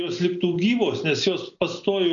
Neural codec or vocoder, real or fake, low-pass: none; real; 7.2 kHz